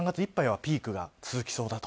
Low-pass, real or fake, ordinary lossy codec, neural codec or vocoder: none; real; none; none